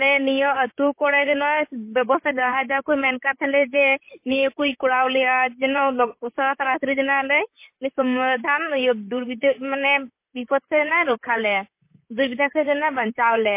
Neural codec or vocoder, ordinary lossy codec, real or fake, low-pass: vocoder, 44.1 kHz, 128 mel bands, Pupu-Vocoder; MP3, 24 kbps; fake; 3.6 kHz